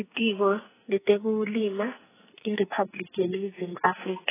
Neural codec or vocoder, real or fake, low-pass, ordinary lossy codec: codec, 44.1 kHz, 2.6 kbps, SNAC; fake; 3.6 kHz; AAC, 16 kbps